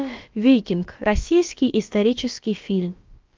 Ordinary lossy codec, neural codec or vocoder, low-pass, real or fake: Opus, 32 kbps; codec, 16 kHz, about 1 kbps, DyCAST, with the encoder's durations; 7.2 kHz; fake